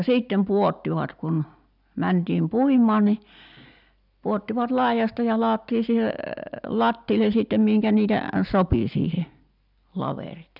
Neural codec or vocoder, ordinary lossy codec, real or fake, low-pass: none; none; real; 5.4 kHz